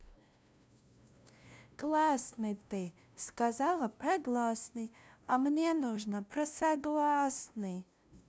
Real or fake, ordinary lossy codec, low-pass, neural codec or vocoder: fake; none; none; codec, 16 kHz, 0.5 kbps, FunCodec, trained on LibriTTS, 25 frames a second